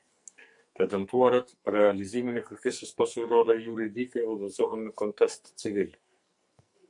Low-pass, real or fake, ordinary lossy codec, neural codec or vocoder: 10.8 kHz; fake; MP3, 64 kbps; codec, 44.1 kHz, 2.6 kbps, SNAC